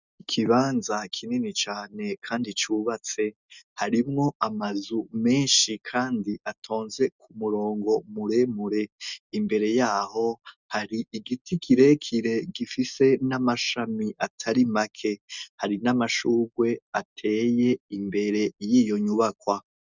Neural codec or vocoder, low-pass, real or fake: none; 7.2 kHz; real